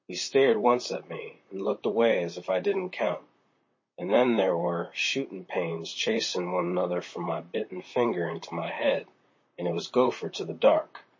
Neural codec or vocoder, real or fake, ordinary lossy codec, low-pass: vocoder, 44.1 kHz, 128 mel bands, Pupu-Vocoder; fake; MP3, 32 kbps; 7.2 kHz